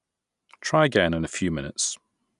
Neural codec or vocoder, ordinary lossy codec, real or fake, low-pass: none; none; real; 10.8 kHz